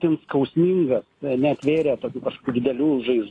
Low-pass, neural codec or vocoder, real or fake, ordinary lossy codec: 10.8 kHz; none; real; AAC, 32 kbps